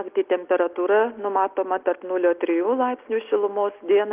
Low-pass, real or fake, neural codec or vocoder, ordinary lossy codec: 3.6 kHz; real; none; Opus, 32 kbps